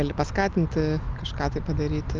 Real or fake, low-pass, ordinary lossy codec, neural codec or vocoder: real; 7.2 kHz; Opus, 32 kbps; none